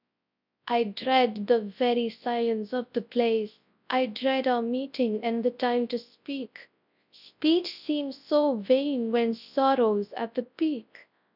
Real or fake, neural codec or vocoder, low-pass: fake; codec, 24 kHz, 0.9 kbps, WavTokenizer, large speech release; 5.4 kHz